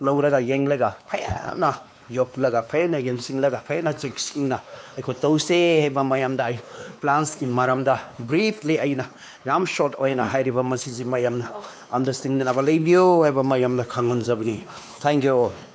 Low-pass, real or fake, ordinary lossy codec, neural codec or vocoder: none; fake; none; codec, 16 kHz, 2 kbps, X-Codec, WavLM features, trained on Multilingual LibriSpeech